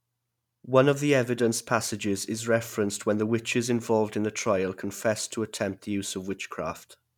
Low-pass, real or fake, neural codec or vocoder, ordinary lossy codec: 19.8 kHz; real; none; none